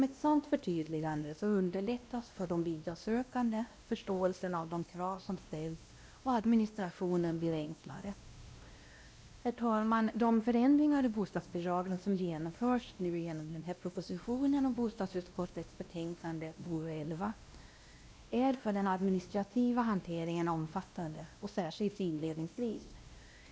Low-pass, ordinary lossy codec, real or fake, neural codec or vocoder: none; none; fake; codec, 16 kHz, 1 kbps, X-Codec, WavLM features, trained on Multilingual LibriSpeech